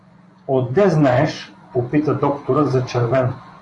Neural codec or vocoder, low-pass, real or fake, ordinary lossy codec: vocoder, 24 kHz, 100 mel bands, Vocos; 10.8 kHz; fake; AAC, 48 kbps